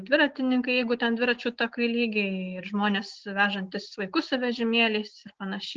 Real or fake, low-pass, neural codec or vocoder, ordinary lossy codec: real; 7.2 kHz; none; Opus, 16 kbps